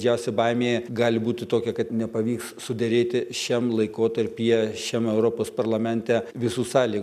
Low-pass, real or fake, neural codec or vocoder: 14.4 kHz; real; none